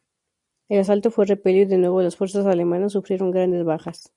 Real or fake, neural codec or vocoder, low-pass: real; none; 10.8 kHz